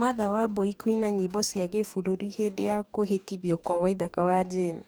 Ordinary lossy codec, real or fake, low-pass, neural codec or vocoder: none; fake; none; codec, 44.1 kHz, 2.6 kbps, DAC